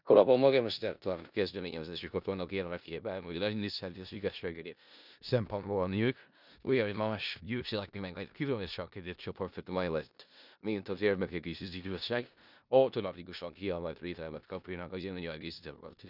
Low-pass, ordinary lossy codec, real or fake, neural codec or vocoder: 5.4 kHz; none; fake; codec, 16 kHz in and 24 kHz out, 0.4 kbps, LongCat-Audio-Codec, four codebook decoder